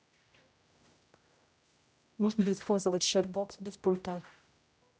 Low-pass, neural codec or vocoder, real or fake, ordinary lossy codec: none; codec, 16 kHz, 0.5 kbps, X-Codec, HuBERT features, trained on general audio; fake; none